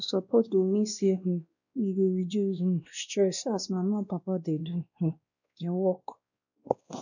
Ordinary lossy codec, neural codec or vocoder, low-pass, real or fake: none; codec, 16 kHz, 1 kbps, X-Codec, WavLM features, trained on Multilingual LibriSpeech; 7.2 kHz; fake